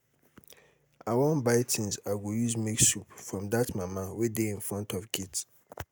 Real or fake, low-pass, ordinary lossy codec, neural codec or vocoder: real; none; none; none